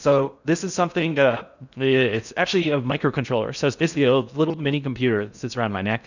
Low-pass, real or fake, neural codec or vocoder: 7.2 kHz; fake; codec, 16 kHz in and 24 kHz out, 0.6 kbps, FocalCodec, streaming, 4096 codes